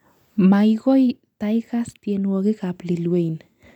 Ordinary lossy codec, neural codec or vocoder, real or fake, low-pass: none; none; real; 19.8 kHz